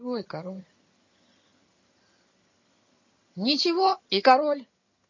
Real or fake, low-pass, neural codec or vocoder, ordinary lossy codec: fake; 7.2 kHz; vocoder, 22.05 kHz, 80 mel bands, HiFi-GAN; MP3, 32 kbps